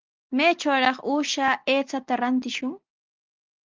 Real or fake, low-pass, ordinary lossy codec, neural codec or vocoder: real; 7.2 kHz; Opus, 32 kbps; none